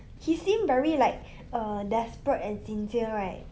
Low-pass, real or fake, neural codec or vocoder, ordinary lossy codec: none; real; none; none